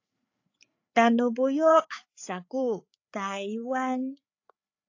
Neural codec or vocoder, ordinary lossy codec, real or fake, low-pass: codec, 16 kHz, 4 kbps, FreqCodec, larger model; AAC, 48 kbps; fake; 7.2 kHz